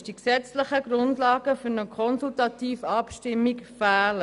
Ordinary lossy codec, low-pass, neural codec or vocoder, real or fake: none; 10.8 kHz; none; real